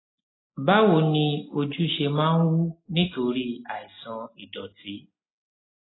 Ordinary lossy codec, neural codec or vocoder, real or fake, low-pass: AAC, 16 kbps; none; real; 7.2 kHz